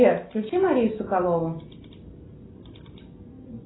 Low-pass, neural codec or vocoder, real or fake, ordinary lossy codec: 7.2 kHz; none; real; AAC, 16 kbps